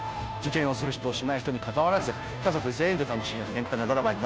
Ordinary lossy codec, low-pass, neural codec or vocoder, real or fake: none; none; codec, 16 kHz, 0.5 kbps, FunCodec, trained on Chinese and English, 25 frames a second; fake